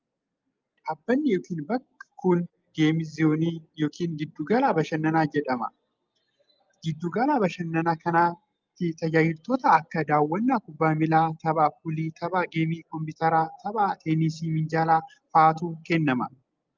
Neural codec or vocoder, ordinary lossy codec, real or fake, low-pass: none; Opus, 24 kbps; real; 7.2 kHz